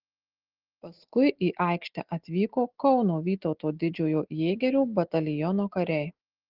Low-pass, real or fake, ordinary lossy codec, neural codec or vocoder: 5.4 kHz; real; Opus, 16 kbps; none